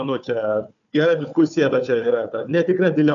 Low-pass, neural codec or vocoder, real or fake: 7.2 kHz; codec, 16 kHz, 4 kbps, FunCodec, trained on Chinese and English, 50 frames a second; fake